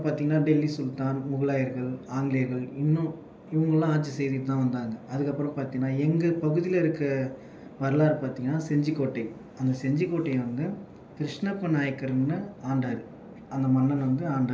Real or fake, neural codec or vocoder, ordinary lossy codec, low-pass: real; none; none; none